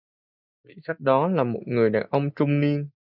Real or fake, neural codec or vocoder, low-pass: real; none; 5.4 kHz